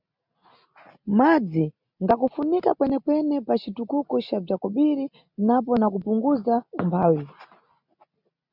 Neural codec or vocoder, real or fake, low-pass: none; real; 5.4 kHz